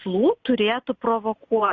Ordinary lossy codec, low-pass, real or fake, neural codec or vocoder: Opus, 64 kbps; 7.2 kHz; real; none